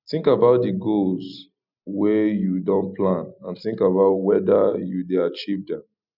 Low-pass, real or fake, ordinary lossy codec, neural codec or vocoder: 5.4 kHz; real; none; none